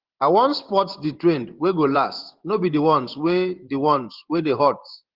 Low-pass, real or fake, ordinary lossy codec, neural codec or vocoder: 5.4 kHz; fake; Opus, 16 kbps; vocoder, 24 kHz, 100 mel bands, Vocos